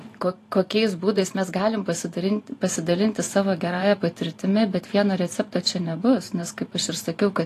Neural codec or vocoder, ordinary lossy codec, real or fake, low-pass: vocoder, 44.1 kHz, 128 mel bands every 512 samples, BigVGAN v2; AAC, 48 kbps; fake; 14.4 kHz